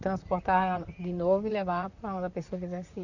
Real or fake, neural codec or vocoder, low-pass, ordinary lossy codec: fake; vocoder, 44.1 kHz, 128 mel bands, Pupu-Vocoder; 7.2 kHz; none